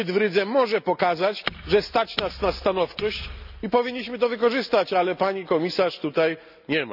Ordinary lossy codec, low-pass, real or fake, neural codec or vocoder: none; 5.4 kHz; real; none